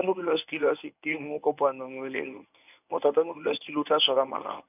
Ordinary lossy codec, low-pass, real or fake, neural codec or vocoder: none; 3.6 kHz; fake; codec, 24 kHz, 0.9 kbps, WavTokenizer, medium speech release version 1